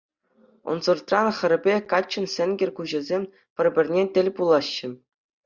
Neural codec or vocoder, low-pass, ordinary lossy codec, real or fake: none; 7.2 kHz; Opus, 64 kbps; real